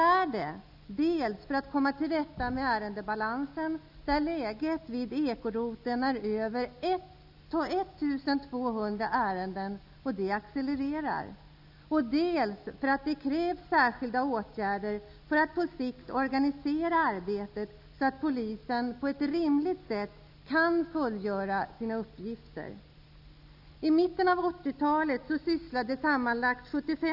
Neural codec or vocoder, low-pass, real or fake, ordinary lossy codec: none; 5.4 kHz; real; none